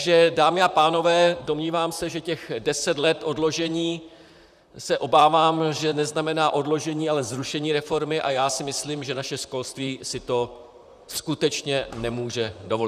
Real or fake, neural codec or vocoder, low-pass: fake; vocoder, 44.1 kHz, 128 mel bands, Pupu-Vocoder; 14.4 kHz